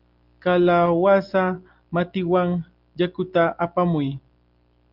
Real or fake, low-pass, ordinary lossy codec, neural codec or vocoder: real; 5.4 kHz; Opus, 24 kbps; none